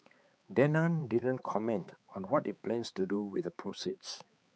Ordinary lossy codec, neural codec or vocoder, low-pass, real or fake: none; codec, 16 kHz, 4 kbps, X-Codec, HuBERT features, trained on balanced general audio; none; fake